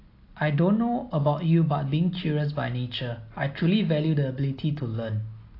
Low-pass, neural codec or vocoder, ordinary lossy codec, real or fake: 5.4 kHz; none; AAC, 32 kbps; real